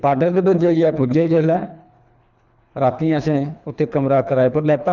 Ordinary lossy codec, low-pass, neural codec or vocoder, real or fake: none; 7.2 kHz; codec, 24 kHz, 3 kbps, HILCodec; fake